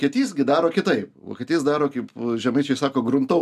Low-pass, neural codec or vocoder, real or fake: 14.4 kHz; none; real